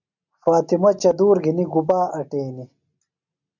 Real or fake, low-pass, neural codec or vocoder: real; 7.2 kHz; none